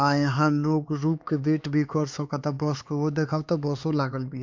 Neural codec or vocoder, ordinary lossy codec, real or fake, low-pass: codec, 16 kHz, 4 kbps, X-Codec, HuBERT features, trained on LibriSpeech; MP3, 64 kbps; fake; 7.2 kHz